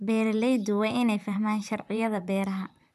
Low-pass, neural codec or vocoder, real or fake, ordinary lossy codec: 14.4 kHz; vocoder, 44.1 kHz, 128 mel bands, Pupu-Vocoder; fake; none